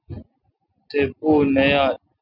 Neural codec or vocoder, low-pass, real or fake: none; 5.4 kHz; real